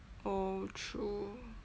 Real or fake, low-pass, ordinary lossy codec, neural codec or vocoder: real; none; none; none